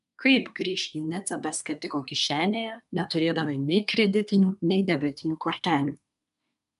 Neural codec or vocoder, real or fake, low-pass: codec, 24 kHz, 1 kbps, SNAC; fake; 10.8 kHz